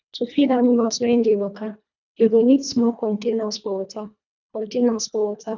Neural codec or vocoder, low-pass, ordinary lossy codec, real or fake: codec, 24 kHz, 1.5 kbps, HILCodec; 7.2 kHz; none; fake